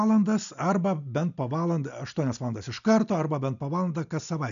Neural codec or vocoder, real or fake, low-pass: none; real; 7.2 kHz